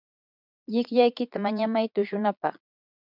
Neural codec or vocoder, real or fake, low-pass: vocoder, 22.05 kHz, 80 mel bands, Vocos; fake; 5.4 kHz